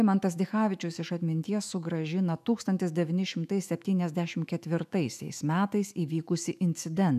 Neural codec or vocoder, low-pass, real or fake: autoencoder, 48 kHz, 128 numbers a frame, DAC-VAE, trained on Japanese speech; 14.4 kHz; fake